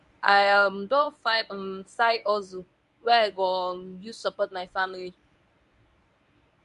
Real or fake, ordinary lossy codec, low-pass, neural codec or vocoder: fake; none; 10.8 kHz; codec, 24 kHz, 0.9 kbps, WavTokenizer, medium speech release version 1